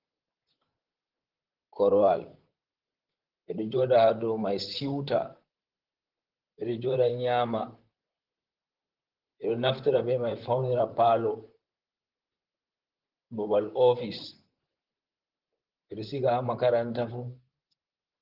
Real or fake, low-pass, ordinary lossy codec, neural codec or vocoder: fake; 5.4 kHz; Opus, 16 kbps; vocoder, 44.1 kHz, 128 mel bands, Pupu-Vocoder